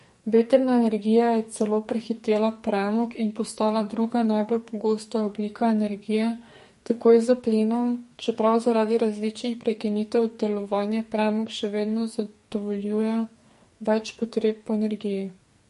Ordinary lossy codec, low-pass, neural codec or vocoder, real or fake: MP3, 48 kbps; 14.4 kHz; codec, 44.1 kHz, 2.6 kbps, SNAC; fake